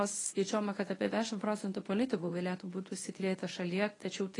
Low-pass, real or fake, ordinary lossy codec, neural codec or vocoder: 10.8 kHz; fake; AAC, 32 kbps; codec, 24 kHz, 0.9 kbps, WavTokenizer, medium speech release version 1